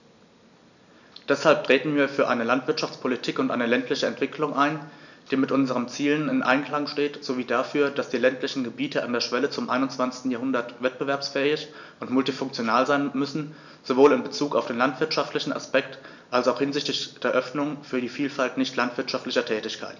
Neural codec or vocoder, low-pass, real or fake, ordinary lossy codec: none; 7.2 kHz; real; none